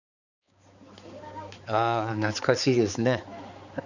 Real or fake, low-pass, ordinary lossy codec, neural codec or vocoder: fake; 7.2 kHz; none; codec, 16 kHz, 4 kbps, X-Codec, HuBERT features, trained on balanced general audio